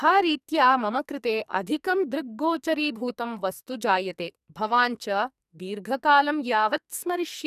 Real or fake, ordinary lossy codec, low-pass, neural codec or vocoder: fake; none; 14.4 kHz; codec, 44.1 kHz, 2.6 kbps, SNAC